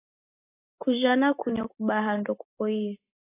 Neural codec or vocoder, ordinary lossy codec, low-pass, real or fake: none; AAC, 16 kbps; 3.6 kHz; real